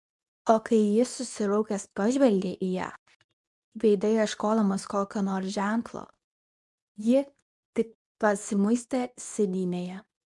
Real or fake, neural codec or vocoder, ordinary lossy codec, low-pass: fake; codec, 24 kHz, 0.9 kbps, WavTokenizer, medium speech release version 2; MP3, 96 kbps; 10.8 kHz